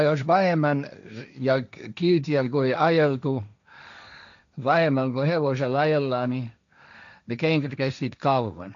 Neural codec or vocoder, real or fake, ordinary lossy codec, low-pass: codec, 16 kHz, 1.1 kbps, Voila-Tokenizer; fake; none; 7.2 kHz